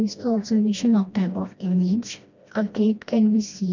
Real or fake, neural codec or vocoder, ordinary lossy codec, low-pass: fake; codec, 16 kHz, 1 kbps, FreqCodec, smaller model; none; 7.2 kHz